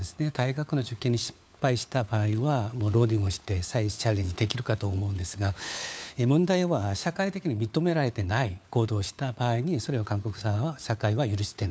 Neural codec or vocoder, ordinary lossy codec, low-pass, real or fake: codec, 16 kHz, 4 kbps, FunCodec, trained on LibriTTS, 50 frames a second; none; none; fake